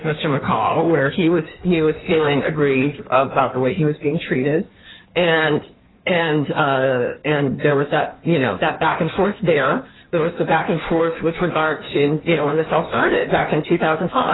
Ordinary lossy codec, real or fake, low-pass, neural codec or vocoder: AAC, 16 kbps; fake; 7.2 kHz; codec, 16 kHz in and 24 kHz out, 1.1 kbps, FireRedTTS-2 codec